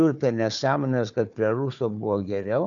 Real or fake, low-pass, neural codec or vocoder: fake; 7.2 kHz; codec, 16 kHz, 4 kbps, FunCodec, trained on Chinese and English, 50 frames a second